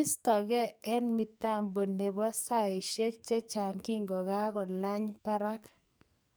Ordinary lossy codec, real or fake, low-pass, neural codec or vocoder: none; fake; none; codec, 44.1 kHz, 2.6 kbps, SNAC